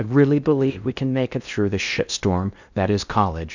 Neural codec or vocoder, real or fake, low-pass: codec, 16 kHz in and 24 kHz out, 0.6 kbps, FocalCodec, streaming, 2048 codes; fake; 7.2 kHz